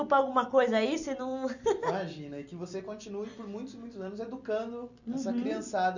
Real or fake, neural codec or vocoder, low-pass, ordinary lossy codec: real; none; 7.2 kHz; none